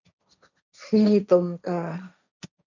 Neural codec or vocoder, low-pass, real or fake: codec, 16 kHz, 1.1 kbps, Voila-Tokenizer; 7.2 kHz; fake